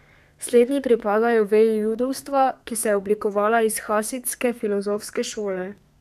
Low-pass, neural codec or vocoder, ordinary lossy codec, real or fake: 14.4 kHz; codec, 32 kHz, 1.9 kbps, SNAC; none; fake